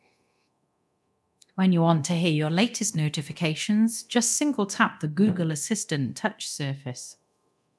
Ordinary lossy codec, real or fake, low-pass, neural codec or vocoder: none; fake; none; codec, 24 kHz, 0.9 kbps, DualCodec